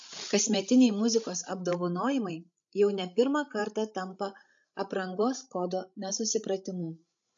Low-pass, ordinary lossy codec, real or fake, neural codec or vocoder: 7.2 kHz; AAC, 64 kbps; fake; codec, 16 kHz, 16 kbps, FreqCodec, larger model